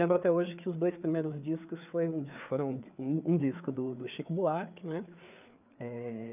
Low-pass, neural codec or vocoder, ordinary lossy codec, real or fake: 3.6 kHz; codec, 16 kHz, 4 kbps, FreqCodec, larger model; none; fake